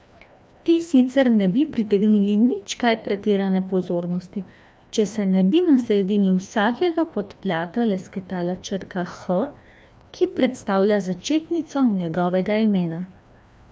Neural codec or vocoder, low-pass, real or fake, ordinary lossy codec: codec, 16 kHz, 1 kbps, FreqCodec, larger model; none; fake; none